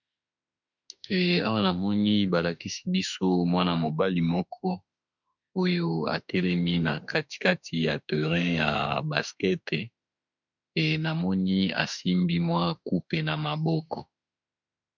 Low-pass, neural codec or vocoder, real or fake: 7.2 kHz; autoencoder, 48 kHz, 32 numbers a frame, DAC-VAE, trained on Japanese speech; fake